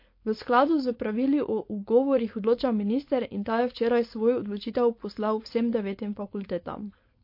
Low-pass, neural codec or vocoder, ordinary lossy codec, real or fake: 5.4 kHz; codec, 16 kHz, 4.8 kbps, FACodec; MP3, 32 kbps; fake